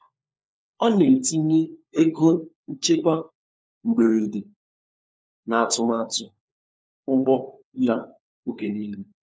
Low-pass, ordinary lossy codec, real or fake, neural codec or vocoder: none; none; fake; codec, 16 kHz, 4 kbps, FunCodec, trained on LibriTTS, 50 frames a second